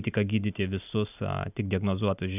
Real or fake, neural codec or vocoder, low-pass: real; none; 3.6 kHz